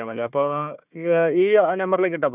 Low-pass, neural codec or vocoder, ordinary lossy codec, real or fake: 3.6 kHz; codec, 16 kHz, 1 kbps, FunCodec, trained on Chinese and English, 50 frames a second; none; fake